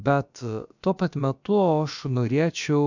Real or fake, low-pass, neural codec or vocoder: fake; 7.2 kHz; codec, 16 kHz, about 1 kbps, DyCAST, with the encoder's durations